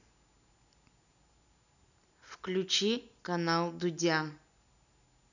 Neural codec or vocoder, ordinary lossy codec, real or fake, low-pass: none; none; real; 7.2 kHz